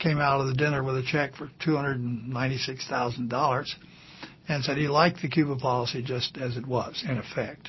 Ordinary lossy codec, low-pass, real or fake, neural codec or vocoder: MP3, 24 kbps; 7.2 kHz; real; none